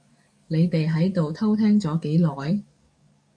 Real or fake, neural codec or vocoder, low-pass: fake; codec, 44.1 kHz, 7.8 kbps, DAC; 9.9 kHz